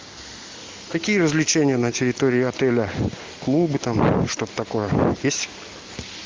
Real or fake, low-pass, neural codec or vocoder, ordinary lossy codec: real; 7.2 kHz; none; Opus, 32 kbps